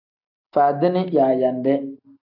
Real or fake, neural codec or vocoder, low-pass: real; none; 5.4 kHz